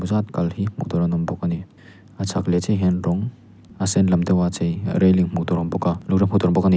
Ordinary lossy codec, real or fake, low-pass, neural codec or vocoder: none; real; none; none